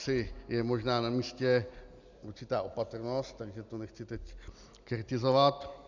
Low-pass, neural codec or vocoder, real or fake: 7.2 kHz; none; real